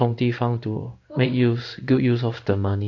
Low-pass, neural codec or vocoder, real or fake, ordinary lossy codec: 7.2 kHz; codec, 16 kHz in and 24 kHz out, 1 kbps, XY-Tokenizer; fake; AAC, 48 kbps